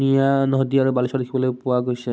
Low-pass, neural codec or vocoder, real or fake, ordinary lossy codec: none; none; real; none